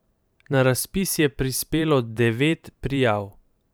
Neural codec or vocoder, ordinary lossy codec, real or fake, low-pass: vocoder, 44.1 kHz, 128 mel bands every 512 samples, BigVGAN v2; none; fake; none